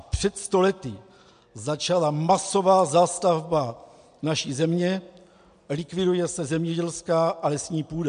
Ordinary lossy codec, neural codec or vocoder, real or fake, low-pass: MP3, 64 kbps; none; real; 9.9 kHz